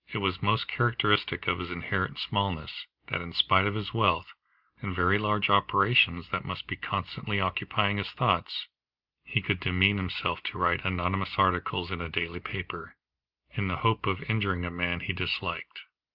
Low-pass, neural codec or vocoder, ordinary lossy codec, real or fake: 5.4 kHz; none; Opus, 32 kbps; real